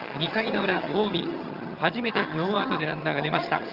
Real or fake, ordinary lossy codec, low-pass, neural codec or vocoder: fake; Opus, 24 kbps; 5.4 kHz; vocoder, 22.05 kHz, 80 mel bands, HiFi-GAN